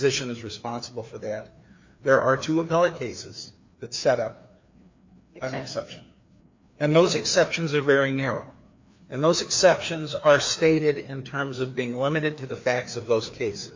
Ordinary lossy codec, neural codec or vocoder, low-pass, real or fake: MP3, 48 kbps; codec, 16 kHz, 2 kbps, FreqCodec, larger model; 7.2 kHz; fake